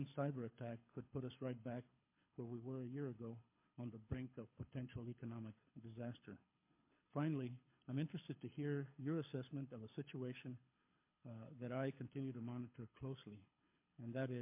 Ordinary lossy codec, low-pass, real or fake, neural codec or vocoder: MP3, 24 kbps; 3.6 kHz; fake; codec, 24 kHz, 6 kbps, HILCodec